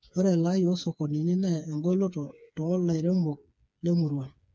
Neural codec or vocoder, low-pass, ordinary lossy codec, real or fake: codec, 16 kHz, 4 kbps, FreqCodec, smaller model; none; none; fake